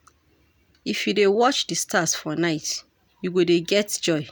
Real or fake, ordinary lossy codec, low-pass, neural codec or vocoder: real; none; none; none